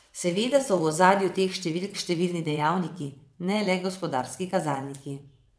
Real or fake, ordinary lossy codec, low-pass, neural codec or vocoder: fake; none; none; vocoder, 22.05 kHz, 80 mel bands, WaveNeXt